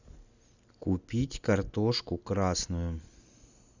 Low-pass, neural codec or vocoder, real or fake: 7.2 kHz; none; real